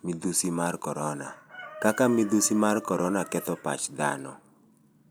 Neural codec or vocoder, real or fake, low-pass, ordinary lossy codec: none; real; none; none